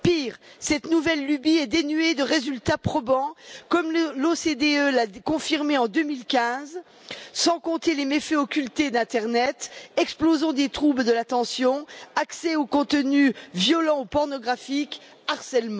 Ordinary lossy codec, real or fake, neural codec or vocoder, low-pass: none; real; none; none